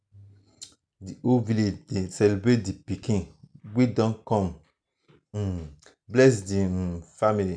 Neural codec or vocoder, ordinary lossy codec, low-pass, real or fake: none; none; 9.9 kHz; real